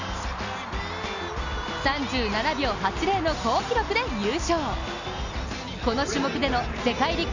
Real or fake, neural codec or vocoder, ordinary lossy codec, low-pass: real; none; none; 7.2 kHz